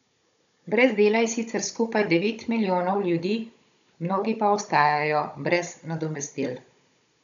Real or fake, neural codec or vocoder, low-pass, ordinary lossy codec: fake; codec, 16 kHz, 16 kbps, FunCodec, trained on Chinese and English, 50 frames a second; 7.2 kHz; none